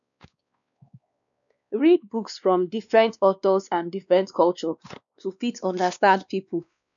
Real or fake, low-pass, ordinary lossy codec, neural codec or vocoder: fake; 7.2 kHz; none; codec, 16 kHz, 2 kbps, X-Codec, WavLM features, trained on Multilingual LibriSpeech